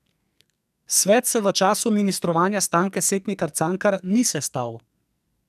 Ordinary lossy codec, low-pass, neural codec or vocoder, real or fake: none; 14.4 kHz; codec, 44.1 kHz, 2.6 kbps, SNAC; fake